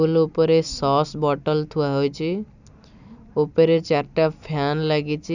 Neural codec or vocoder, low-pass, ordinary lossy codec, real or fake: none; 7.2 kHz; none; real